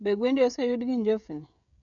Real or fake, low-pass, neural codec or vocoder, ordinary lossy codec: fake; 7.2 kHz; codec, 16 kHz, 16 kbps, FreqCodec, smaller model; none